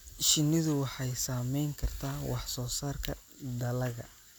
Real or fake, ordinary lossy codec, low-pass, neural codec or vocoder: real; none; none; none